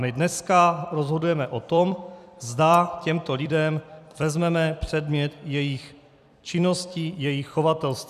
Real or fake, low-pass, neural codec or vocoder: fake; 14.4 kHz; vocoder, 44.1 kHz, 128 mel bands every 256 samples, BigVGAN v2